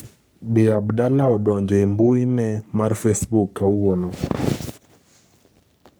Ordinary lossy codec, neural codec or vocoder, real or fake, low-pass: none; codec, 44.1 kHz, 3.4 kbps, Pupu-Codec; fake; none